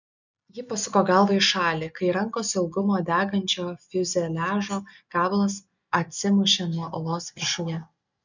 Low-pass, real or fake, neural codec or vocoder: 7.2 kHz; real; none